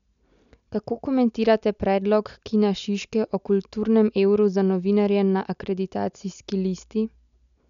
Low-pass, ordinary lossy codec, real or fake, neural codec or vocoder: 7.2 kHz; none; real; none